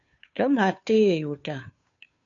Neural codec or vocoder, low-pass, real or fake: codec, 16 kHz, 2 kbps, FunCodec, trained on Chinese and English, 25 frames a second; 7.2 kHz; fake